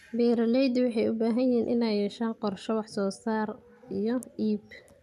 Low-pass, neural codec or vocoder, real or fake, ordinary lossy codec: 14.4 kHz; none; real; MP3, 96 kbps